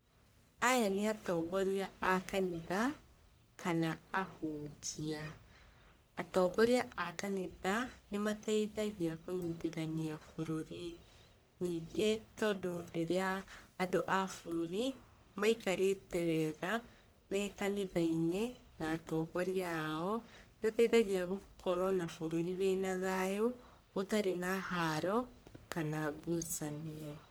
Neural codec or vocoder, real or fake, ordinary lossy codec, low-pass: codec, 44.1 kHz, 1.7 kbps, Pupu-Codec; fake; none; none